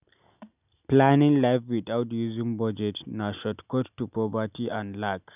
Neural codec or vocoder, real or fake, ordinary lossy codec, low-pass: none; real; none; 3.6 kHz